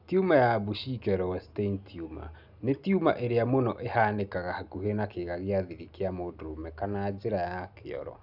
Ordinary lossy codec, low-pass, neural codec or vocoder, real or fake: none; 5.4 kHz; none; real